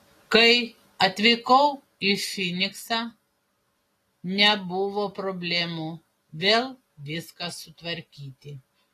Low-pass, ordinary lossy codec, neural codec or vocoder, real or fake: 14.4 kHz; AAC, 48 kbps; none; real